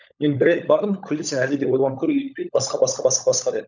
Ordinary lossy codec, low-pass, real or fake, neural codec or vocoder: none; 7.2 kHz; fake; codec, 16 kHz, 16 kbps, FunCodec, trained on LibriTTS, 50 frames a second